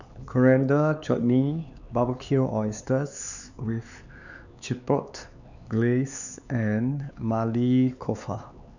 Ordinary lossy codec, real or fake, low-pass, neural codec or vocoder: none; fake; 7.2 kHz; codec, 16 kHz, 4 kbps, X-Codec, HuBERT features, trained on LibriSpeech